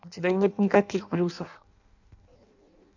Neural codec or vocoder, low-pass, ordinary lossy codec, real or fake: codec, 16 kHz in and 24 kHz out, 0.6 kbps, FireRedTTS-2 codec; 7.2 kHz; none; fake